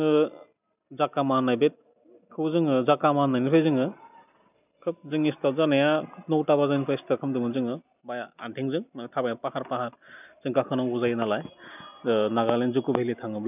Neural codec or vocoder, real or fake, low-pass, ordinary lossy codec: none; real; 3.6 kHz; none